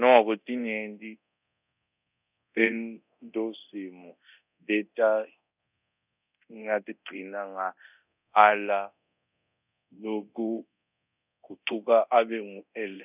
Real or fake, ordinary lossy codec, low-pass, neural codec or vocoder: fake; none; 3.6 kHz; codec, 24 kHz, 0.9 kbps, DualCodec